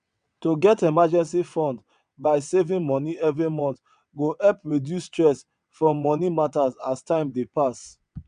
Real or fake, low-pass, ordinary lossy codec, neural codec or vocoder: fake; 9.9 kHz; none; vocoder, 22.05 kHz, 80 mel bands, WaveNeXt